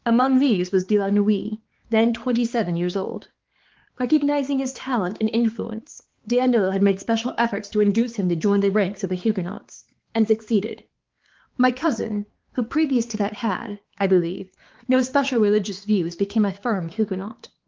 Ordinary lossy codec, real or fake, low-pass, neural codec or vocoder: Opus, 16 kbps; fake; 7.2 kHz; codec, 16 kHz, 4 kbps, X-Codec, HuBERT features, trained on balanced general audio